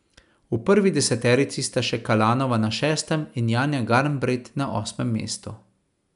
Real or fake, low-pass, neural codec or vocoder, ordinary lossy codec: real; 10.8 kHz; none; none